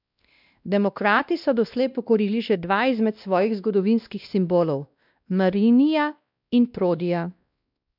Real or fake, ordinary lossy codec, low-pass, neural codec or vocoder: fake; none; 5.4 kHz; codec, 16 kHz, 1 kbps, X-Codec, WavLM features, trained on Multilingual LibriSpeech